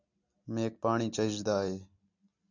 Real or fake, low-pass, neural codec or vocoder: real; 7.2 kHz; none